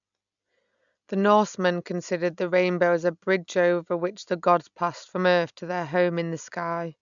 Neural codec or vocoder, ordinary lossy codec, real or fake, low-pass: none; none; real; 7.2 kHz